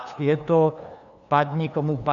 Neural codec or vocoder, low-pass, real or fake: codec, 16 kHz, 2 kbps, FunCodec, trained on LibriTTS, 25 frames a second; 7.2 kHz; fake